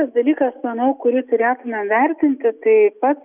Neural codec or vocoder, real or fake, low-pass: none; real; 3.6 kHz